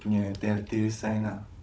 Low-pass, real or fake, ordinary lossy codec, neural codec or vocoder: none; fake; none; codec, 16 kHz, 16 kbps, FunCodec, trained on Chinese and English, 50 frames a second